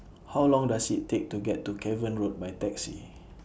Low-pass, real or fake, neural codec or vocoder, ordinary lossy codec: none; real; none; none